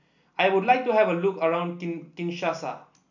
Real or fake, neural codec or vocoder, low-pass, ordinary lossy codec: real; none; 7.2 kHz; none